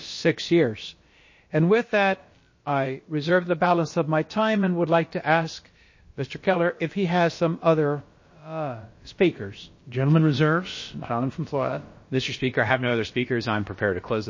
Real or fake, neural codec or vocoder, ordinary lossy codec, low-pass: fake; codec, 16 kHz, about 1 kbps, DyCAST, with the encoder's durations; MP3, 32 kbps; 7.2 kHz